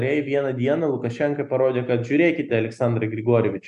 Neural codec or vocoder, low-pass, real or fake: none; 10.8 kHz; real